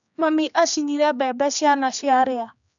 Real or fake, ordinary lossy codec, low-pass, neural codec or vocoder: fake; none; 7.2 kHz; codec, 16 kHz, 2 kbps, X-Codec, HuBERT features, trained on general audio